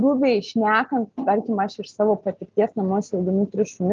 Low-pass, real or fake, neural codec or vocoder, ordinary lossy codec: 7.2 kHz; real; none; Opus, 32 kbps